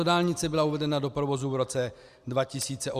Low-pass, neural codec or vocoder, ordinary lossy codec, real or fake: 14.4 kHz; none; Opus, 64 kbps; real